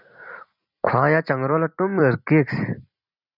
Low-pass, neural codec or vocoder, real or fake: 5.4 kHz; vocoder, 44.1 kHz, 128 mel bands every 256 samples, BigVGAN v2; fake